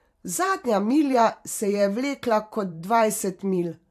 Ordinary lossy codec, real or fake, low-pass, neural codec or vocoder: AAC, 64 kbps; fake; 14.4 kHz; vocoder, 44.1 kHz, 128 mel bands every 512 samples, BigVGAN v2